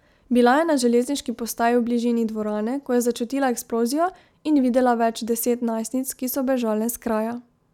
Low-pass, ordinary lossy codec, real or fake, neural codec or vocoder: 19.8 kHz; none; real; none